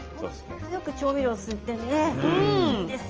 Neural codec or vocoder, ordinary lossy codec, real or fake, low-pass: none; Opus, 24 kbps; real; 7.2 kHz